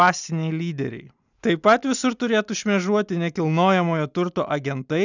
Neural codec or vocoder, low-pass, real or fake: none; 7.2 kHz; real